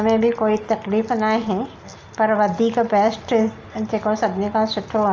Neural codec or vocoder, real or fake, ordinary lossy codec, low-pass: none; real; Opus, 24 kbps; 7.2 kHz